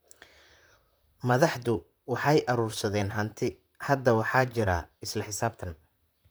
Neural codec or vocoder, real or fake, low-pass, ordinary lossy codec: vocoder, 44.1 kHz, 128 mel bands, Pupu-Vocoder; fake; none; none